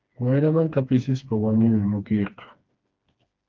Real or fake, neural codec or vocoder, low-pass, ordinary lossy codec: fake; codec, 16 kHz, 2 kbps, FreqCodec, smaller model; 7.2 kHz; Opus, 24 kbps